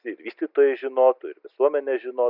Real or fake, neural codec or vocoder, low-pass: real; none; 5.4 kHz